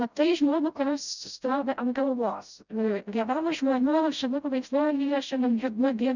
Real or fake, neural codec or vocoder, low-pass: fake; codec, 16 kHz, 0.5 kbps, FreqCodec, smaller model; 7.2 kHz